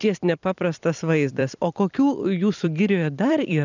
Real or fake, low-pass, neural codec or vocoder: fake; 7.2 kHz; vocoder, 44.1 kHz, 128 mel bands every 256 samples, BigVGAN v2